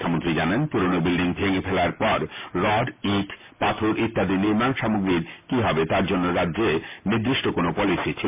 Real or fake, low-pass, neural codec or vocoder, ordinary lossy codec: real; 3.6 kHz; none; MP3, 32 kbps